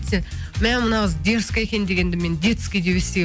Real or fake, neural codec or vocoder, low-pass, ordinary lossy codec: real; none; none; none